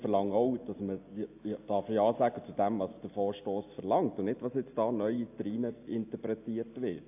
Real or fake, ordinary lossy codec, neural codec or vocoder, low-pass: real; AAC, 32 kbps; none; 3.6 kHz